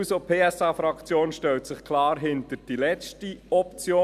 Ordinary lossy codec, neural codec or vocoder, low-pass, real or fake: none; none; 14.4 kHz; real